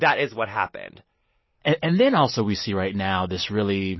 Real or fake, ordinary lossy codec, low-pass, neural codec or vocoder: real; MP3, 24 kbps; 7.2 kHz; none